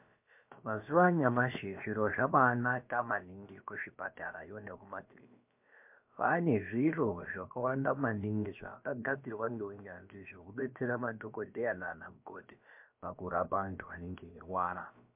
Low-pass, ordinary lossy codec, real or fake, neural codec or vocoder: 3.6 kHz; MP3, 32 kbps; fake; codec, 16 kHz, about 1 kbps, DyCAST, with the encoder's durations